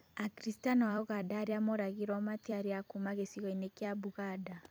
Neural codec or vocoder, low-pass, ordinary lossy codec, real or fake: vocoder, 44.1 kHz, 128 mel bands every 256 samples, BigVGAN v2; none; none; fake